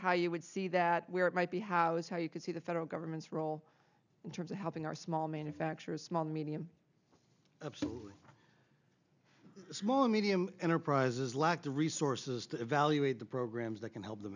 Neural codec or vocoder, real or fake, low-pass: none; real; 7.2 kHz